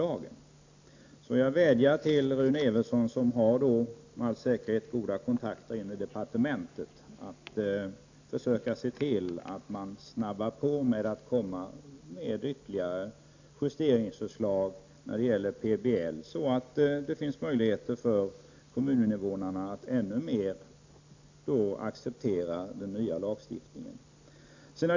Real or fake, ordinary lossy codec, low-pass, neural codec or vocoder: real; AAC, 48 kbps; 7.2 kHz; none